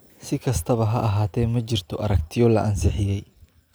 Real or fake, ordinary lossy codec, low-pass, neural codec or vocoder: real; none; none; none